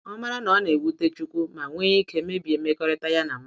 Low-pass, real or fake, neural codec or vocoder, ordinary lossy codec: none; real; none; none